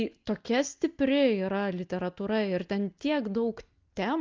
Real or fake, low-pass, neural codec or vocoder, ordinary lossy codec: real; 7.2 kHz; none; Opus, 32 kbps